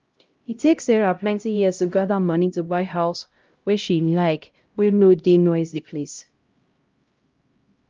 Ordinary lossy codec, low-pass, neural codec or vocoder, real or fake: Opus, 24 kbps; 7.2 kHz; codec, 16 kHz, 0.5 kbps, X-Codec, HuBERT features, trained on LibriSpeech; fake